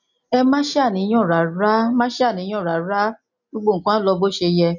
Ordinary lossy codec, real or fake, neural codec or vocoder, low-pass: none; real; none; 7.2 kHz